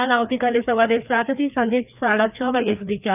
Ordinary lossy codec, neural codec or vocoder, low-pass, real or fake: none; codec, 16 kHz, 2 kbps, FreqCodec, larger model; 3.6 kHz; fake